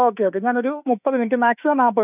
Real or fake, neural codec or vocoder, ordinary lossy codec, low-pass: fake; autoencoder, 48 kHz, 32 numbers a frame, DAC-VAE, trained on Japanese speech; none; 3.6 kHz